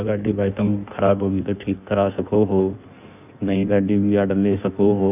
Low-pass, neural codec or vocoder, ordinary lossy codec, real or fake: 3.6 kHz; codec, 16 kHz in and 24 kHz out, 1.1 kbps, FireRedTTS-2 codec; none; fake